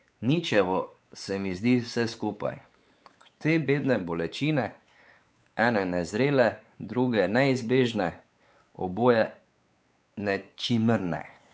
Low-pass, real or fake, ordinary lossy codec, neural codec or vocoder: none; fake; none; codec, 16 kHz, 4 kbps, X-Codec, WavLM features, trained on Multilingual LibriSpeech